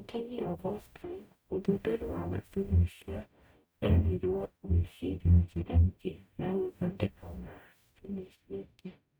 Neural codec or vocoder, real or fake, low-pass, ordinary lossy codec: codec, 44.1 kHz, 0.9 kbps, DAC; fake; none; none